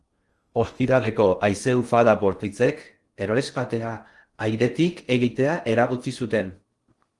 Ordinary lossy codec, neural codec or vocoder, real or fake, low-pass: Opus, 24 kbps; codec, 16 kHz in and 24 kHz out, 0.6 kbps, FocalCodec, streaming, 2048 codes; fake; 10.8 kHz